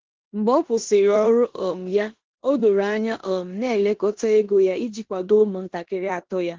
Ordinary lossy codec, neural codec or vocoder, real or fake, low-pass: Opus, 16 kbps; codec, 16 kHz in and 24 kHz out, 0.9 kbps, LongCat-Audio-Codec, four codebook decoder; fake; 7.2 kHz